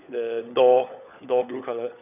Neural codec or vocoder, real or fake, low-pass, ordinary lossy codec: codec, 16 kHz, 16 kbps, FunCodec, trained on LibriTTS, 50 frames a second; fake; 3.6 kHz; none